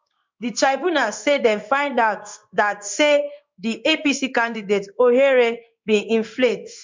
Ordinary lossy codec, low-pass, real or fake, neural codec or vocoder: MP3, 64 kbps; 7.2 kHz; fake; codec, 16 kHz in and 24 kHz out, 1 kbps, XY-Tokenizer